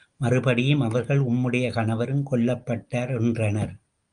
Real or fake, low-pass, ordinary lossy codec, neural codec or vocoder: real; 9.9 kHz; Opus, 32 kbps; none